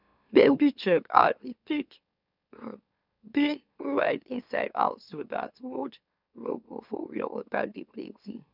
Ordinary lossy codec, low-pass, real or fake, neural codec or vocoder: none; 5.4 kHz; fake; autoencoder, 44.1 kHz, a latent of 192 numbers a frame, MeloTTS